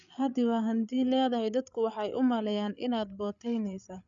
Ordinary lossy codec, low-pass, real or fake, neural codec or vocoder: none; 7.2 kHz; fake; codec, 16 kHz, 8 kbps, FreqCodec, larger model